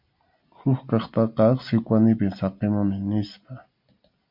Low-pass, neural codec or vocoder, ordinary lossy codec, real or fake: 5.4 kHz; none; MP3, 48 kbps; real